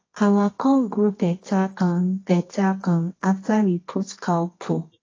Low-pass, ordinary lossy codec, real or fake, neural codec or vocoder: 7.2 kHz; AAC, 32 kbps; fake; codec, 24 kHz, 0.9 kbps, WavTokenizer, medium music audio release